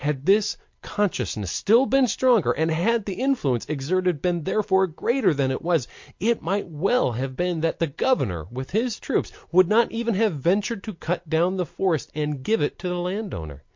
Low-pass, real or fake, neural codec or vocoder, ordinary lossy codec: 7.2 kHz; real; none; MP3, 48 kbps